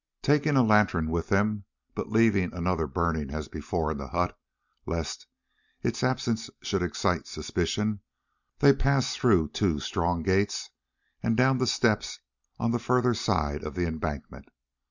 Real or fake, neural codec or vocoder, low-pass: real; none; 7.2 kHz